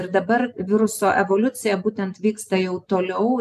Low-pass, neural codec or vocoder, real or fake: 14.4 kHz; none; real